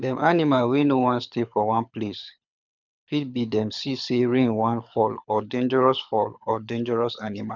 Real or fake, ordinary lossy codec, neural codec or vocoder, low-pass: fake; none; codec, 24 kHz, 6 kbps, HILCodec; 7.2 kHz